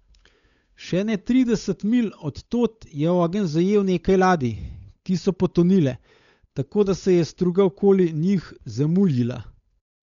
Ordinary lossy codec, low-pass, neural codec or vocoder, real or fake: none; 7.2 kHz; codec, 16 kHz, 8 kbps, FunCodec, trained on Chinese and English, 25 frames a second; fake